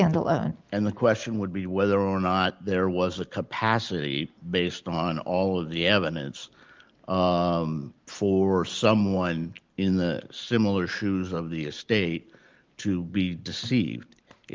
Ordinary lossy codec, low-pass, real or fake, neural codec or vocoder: Opus, 32 kbps; 7.2 kHz; real; none